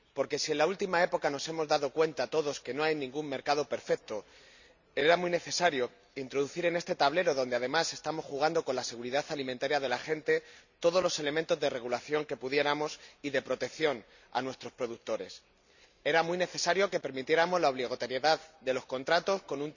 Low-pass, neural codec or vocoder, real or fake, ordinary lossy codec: 7.2 kHz; none; real; none